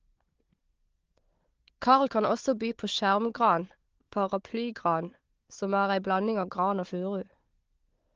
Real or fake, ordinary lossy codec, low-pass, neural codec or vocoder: fake; Opus, 16 kbps; 7.2 kHz; codec, 16 kHz, 4 kbps, FunCodec, trained on Chinese and English, 50 frames a second